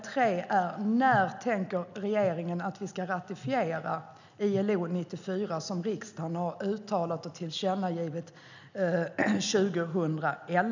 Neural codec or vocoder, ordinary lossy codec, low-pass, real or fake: none; none; 7.2 kHz; real